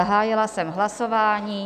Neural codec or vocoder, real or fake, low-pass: none; real; 14.4 kHz